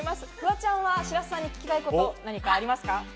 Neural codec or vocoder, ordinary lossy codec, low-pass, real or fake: none; none; none; real